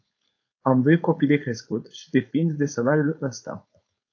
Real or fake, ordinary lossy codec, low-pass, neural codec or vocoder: fake; AAC, 48 kbps; 7.2 kHz; codec, 16 kHz, 4.8 kbps, FACodec